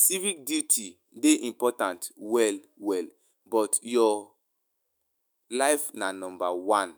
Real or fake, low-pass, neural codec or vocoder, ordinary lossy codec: fake; none; autoencoder, 48 kHz, 128 numbers a frame, DAC-VAE, trained on Japanese speech; none